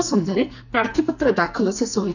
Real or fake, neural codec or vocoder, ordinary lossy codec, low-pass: fake; codec, 44.1 kHz, 2.6 kbps, SNAC; none; 7.2 kHz